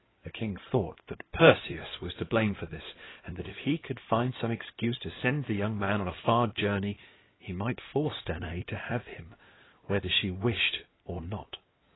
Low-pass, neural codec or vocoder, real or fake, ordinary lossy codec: 7.2 kHz; codec, 16 kHz in and 24 kHz out, 2.2 kbps, FireRedTTS-2 codec; fake; AAC, 16 kbps